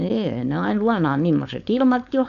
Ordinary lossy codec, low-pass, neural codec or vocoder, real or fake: none; 7.2 kHz; codec, 16 kHz, 4.8 kbps, FACodec; fake